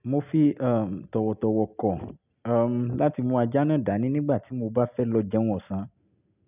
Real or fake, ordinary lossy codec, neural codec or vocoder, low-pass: real; none; none; 3.6 kHz